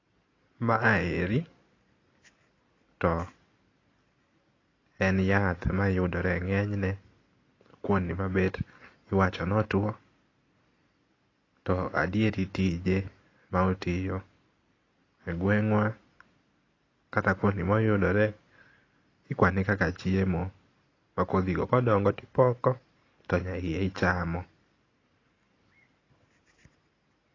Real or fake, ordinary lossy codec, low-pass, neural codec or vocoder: real; AAC, 32 kbps; 7.2 kHz; none